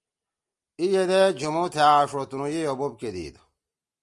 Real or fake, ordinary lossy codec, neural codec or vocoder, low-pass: real; Opus, 32 kbps; none; 10.8 kHz